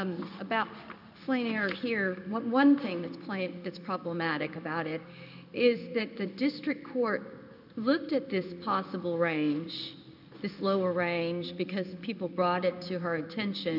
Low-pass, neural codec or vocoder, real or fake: 5.4 kHz; codec, 16 kHz in and 24 kHz out, 1 kbps, XY-Tokenizer; fake